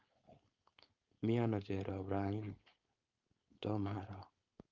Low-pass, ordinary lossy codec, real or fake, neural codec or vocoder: 7.2 kHz; Opus, 32 kbps; fake; codec, 16 kHz, 4.8 kbps, FACodec